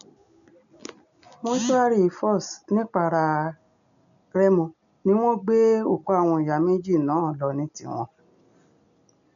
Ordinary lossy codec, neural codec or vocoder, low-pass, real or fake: none; none; 7.2 kHz; real